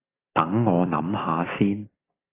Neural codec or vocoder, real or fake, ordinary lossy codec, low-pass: none; real; AAC, 16 kbps; 3.6 kHz